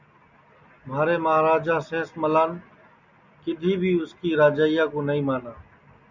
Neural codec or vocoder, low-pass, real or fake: none; 7.2 kHz; real